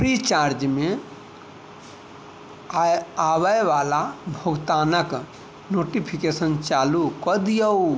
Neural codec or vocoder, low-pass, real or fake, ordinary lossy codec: none; none; real; none